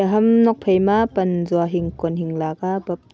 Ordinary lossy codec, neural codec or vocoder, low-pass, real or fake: none; none; none; real